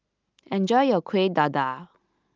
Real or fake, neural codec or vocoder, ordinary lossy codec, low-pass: real; none; Opus, 32 kbps; 7.2 kHz